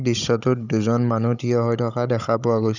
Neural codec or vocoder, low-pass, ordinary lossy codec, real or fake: codec, 16 kHz, 16 kbps, FunCodec, trained on Chinese and English, 50 frames a second; 7.2 kHz; none; fake